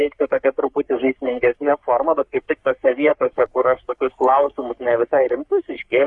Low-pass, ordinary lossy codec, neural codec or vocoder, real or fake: 10.8 kHz; Opus, 64 kbps; codec, 44.1 kHz, 3.4 kbps, Pupu-Codec; fake